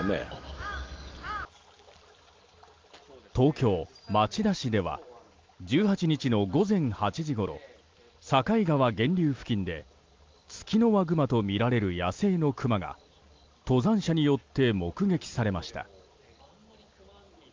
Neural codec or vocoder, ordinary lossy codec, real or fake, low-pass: none; Opus, 24 kbps; real; 7.2 kHz